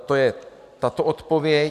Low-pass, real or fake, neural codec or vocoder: 14.4 kHz; real; none